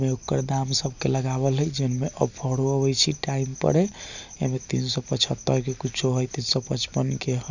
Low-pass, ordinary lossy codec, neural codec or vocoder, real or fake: 7.2 kHz; none; none; real